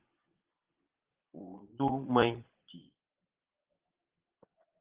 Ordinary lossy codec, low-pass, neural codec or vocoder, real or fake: Opus, 16 kbps; 3.6 kHz; vocoder, 22.05 kHz, 80 mel bands, Vocos; fake